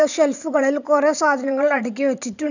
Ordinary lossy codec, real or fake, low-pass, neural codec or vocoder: none; fake; 7.2 kHz; vocoder, 44.1 kHz, 128 mel bands every 512 samples, BigVGAN v2